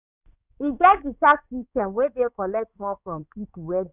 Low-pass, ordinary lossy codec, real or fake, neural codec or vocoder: 3.6 kHz; none; fake; codec, 16 kHz in and 24 kHz out, 2.2 kbps, FireRedTTS-2 codec